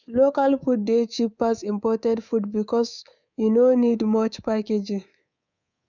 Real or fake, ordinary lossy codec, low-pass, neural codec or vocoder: fake; none; 7.2 kHz; codec, 24 kHz, 3.1 kbps, DualCodec